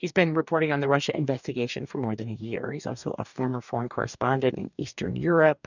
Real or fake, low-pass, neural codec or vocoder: fake; 7.2 kHz; codec, 44.1 kHz, 2.6 kbps, DAC